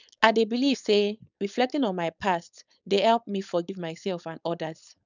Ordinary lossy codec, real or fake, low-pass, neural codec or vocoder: none; fake; 7.2 kHz; codec, 16 kHz, 4.8 kbps, FACodec